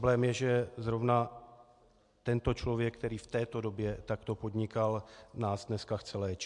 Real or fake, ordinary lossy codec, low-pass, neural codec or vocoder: real; MP3, 64 kbps; 10.8 kHz; none